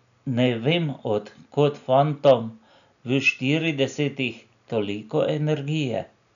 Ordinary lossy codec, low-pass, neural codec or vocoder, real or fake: none; 7.2 kHz; none; real